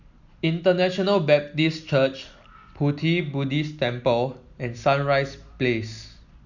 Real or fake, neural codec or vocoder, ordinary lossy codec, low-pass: real; none; none; 7.2 kHz